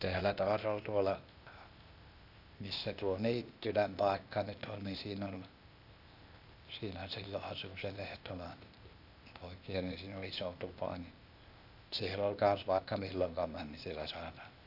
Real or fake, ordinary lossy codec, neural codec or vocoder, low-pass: fake; none; codec, 16 kHz, 0.8 kbps, ZipCodec; 5.4 kHz